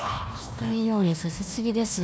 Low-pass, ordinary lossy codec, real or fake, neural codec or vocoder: none; none; fake; codec, 16 kHz, 1 kbps, FunCodec, trained on Chinese and English, 50 frames a second